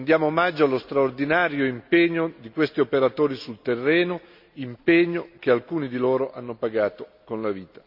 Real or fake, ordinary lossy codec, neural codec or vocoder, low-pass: real; none; none; 5.4 kHz